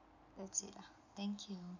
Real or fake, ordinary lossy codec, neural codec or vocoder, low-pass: real; none; none; 7.2 kHz